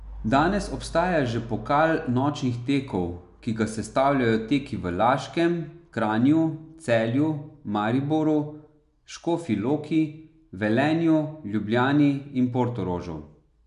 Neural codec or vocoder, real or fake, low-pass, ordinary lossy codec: none; real; 10.8 kHz; none